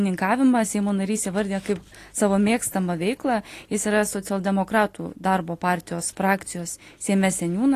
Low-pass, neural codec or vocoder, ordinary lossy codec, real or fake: 14.4 kHz; none; AAC, 48 kbps; real